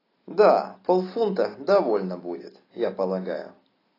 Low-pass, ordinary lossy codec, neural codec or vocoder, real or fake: 5.4 kHz; AAC, 24 kbps; none; real